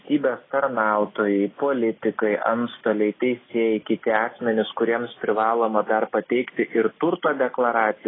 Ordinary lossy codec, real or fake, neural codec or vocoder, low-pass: AAC, 16 kbps; real; none; 7.2 kHz